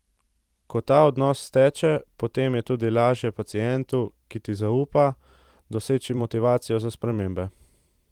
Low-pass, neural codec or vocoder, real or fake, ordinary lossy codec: 19.8 kHz; vocoder, 44.1 kHz, 128 mel bands every 512 samples, BigVGAN v2; fake; Opus, 24 kbps